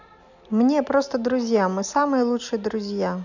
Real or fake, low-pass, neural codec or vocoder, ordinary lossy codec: real; 7.2 kHz; none; none